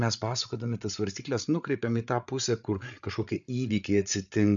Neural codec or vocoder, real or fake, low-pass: codec, 16 kHz, 8 kbps, FreqCodec, larger model; fake; 7.2 kHz